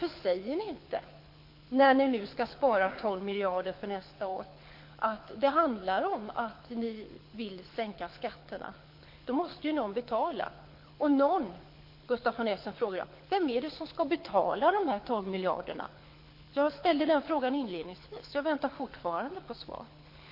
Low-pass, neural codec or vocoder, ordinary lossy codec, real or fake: 5.4 kHz; codec, 24 kHz, 6 kbps, HILCodec; MP3, 32 kbps; fake